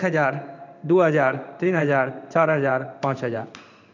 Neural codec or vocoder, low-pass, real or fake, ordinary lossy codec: codec, 16 kHz in and 24 kHz out, 1 kbps, XY-Tokenizer; 7.2 kHz; fake; none